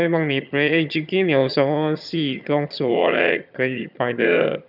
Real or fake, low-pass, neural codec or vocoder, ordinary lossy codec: fake; 5.4 kHz; vocoder, 22.05 kHz, 80 mel bands, HiFi-GAN; none